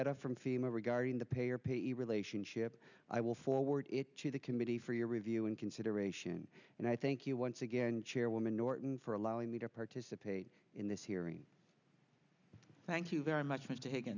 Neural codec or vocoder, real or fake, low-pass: none; real; 7.2 kHz